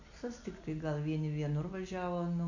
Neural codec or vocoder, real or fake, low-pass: none; real; 7.2 kHz